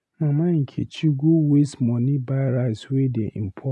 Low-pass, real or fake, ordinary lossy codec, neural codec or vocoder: none; real; none; none